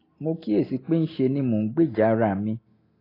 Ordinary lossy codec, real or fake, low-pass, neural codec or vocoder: AAC, 24 kbps; real; 5.4 kHz; none